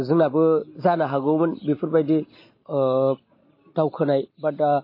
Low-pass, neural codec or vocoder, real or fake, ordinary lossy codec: 5.4 kHz; none; real; MP3, 24 kbps